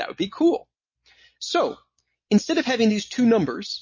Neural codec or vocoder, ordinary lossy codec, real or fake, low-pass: none; MP3, 32 kbps; real; 7.2 kHz